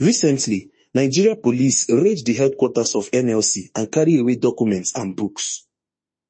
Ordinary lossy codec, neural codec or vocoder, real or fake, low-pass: MP3, 32 kbps; autoencoder, 48 kHz, 32 numbers a frame, DAC-VAE, trained on Japanese speech; fake; 9.9 kHz